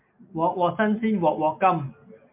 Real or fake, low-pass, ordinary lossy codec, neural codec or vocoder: real; 3.6 kHz; MP3, 32 kbps; none